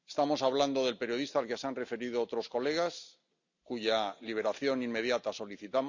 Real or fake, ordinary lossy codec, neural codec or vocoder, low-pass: real; Opus, 64 kbps; none; 7.2 kHz